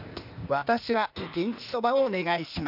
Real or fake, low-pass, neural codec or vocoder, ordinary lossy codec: fake; 5.4 kHz; codec, 16 kHz, 0.8 kbps, ZipCodec; none